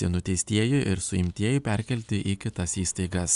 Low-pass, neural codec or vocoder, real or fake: 10.8 kHz; none; real